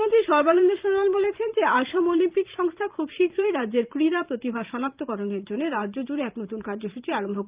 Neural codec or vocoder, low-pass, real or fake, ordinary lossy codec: vocoder, 44.1 kHz, 128 mel bands every 512 samples, BigVGAN v2; 3.6 kHz; fake; Opus, 24 kbps